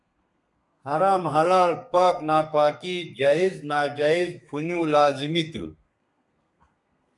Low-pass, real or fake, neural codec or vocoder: 10.8 kHz; fake; codec, 32 kHz, 1.9 kbps, SNAC